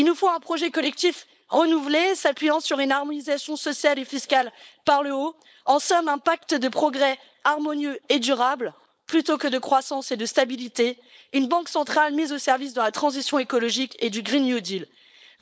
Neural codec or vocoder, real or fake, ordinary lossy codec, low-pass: codec, 16 kHz, 4.8 kbps, FACodec; fake; none; none